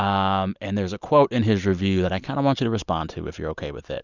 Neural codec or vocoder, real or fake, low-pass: none; real; 7.2 kHz